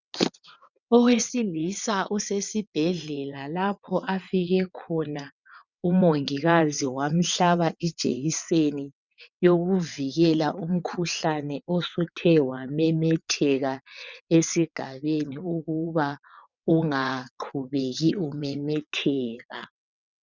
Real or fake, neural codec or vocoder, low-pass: fake; vocoder, 22.05 kHz, 80 mel bands, WaveNeXt; 7.2 kHz